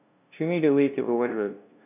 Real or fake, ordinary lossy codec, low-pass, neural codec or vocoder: fake; none; 3.6 kHz; codec, 16 kHz, 0.5 kbps, FunCodec, trained on LibriTTS, 25 frames a second